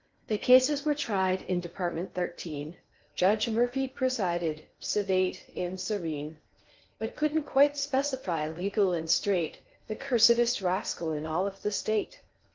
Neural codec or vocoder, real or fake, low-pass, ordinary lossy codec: codec, 16 kHz in and 24 kHz out, 0.6 kbps, FocalCodec, streaming, 4096 codes; fake; 7.2 kHz; Opus, 32 kbps